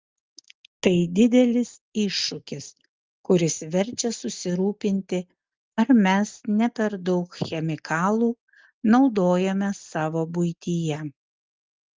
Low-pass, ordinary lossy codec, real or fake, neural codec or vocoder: 7.2 kHz; Opus, 32 kbps; real; none